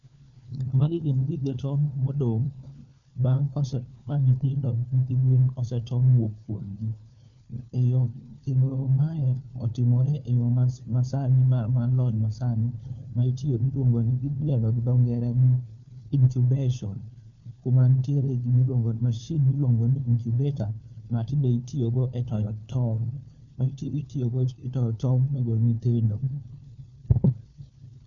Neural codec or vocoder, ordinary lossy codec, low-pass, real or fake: codec, 16 kHz, 4 kbps, FunCodec, trained on LibriTTS, 50 frames a second; none; 7.2 kHz; fake